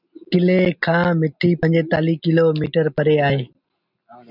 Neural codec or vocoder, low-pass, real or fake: none; 5.4 kHz; real